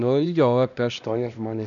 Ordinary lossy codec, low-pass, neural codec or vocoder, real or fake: MP3, 96 kbps; 7.2 kHz; codec, 16 kHz, 1 kbps, X-Codec, HuBERT features, trained on LibriSpeech; fake